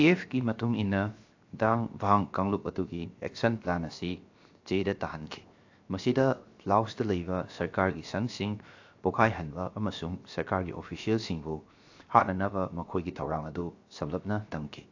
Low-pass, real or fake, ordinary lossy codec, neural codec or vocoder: 7.2 kHz; fake; AAC, 48 kbps; codec, 16 kHz, 0.7 kbps, FocalCodec